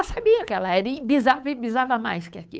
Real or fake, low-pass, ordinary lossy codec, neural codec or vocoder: fake; none; none; codec, 16 kHz, 8 kbps, FunCodec, trained on Chinese and English, 25 frames a second